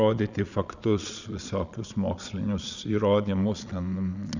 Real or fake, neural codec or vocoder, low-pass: fake; vocoder, 22.05 kHz, 80 mel bands, Vocos; 7.2 kHz